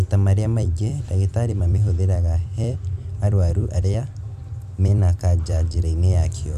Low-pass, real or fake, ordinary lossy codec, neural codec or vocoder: 14.4 kHz; fake; Opus, 64 kbps; vocoder, 44.1 kHz, 128 mel bands every 256 samples, BigVGAN v2